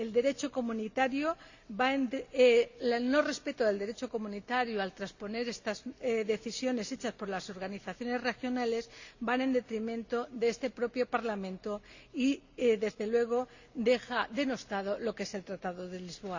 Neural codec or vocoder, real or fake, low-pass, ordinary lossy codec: none; real; 7.2 kHz; Opus, 64 kbps